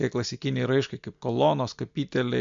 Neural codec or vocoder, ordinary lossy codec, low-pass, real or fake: none; MP3, 64 kbps; 7.2 kHz; real